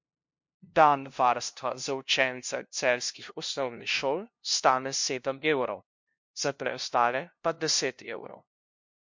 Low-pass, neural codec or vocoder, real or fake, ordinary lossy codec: 7.2 kHz; codec, 16 kHz, 0.5 kbps, FunCodec, trained on LibriTTS, 25 frames a second; fake; MP3, 48 kbps